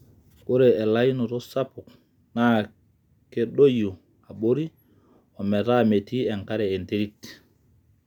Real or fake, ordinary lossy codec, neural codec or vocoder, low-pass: real; none; none; 19.8 kHz